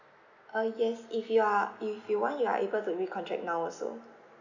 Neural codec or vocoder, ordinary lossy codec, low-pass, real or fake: none; none; 7.2 kHz; real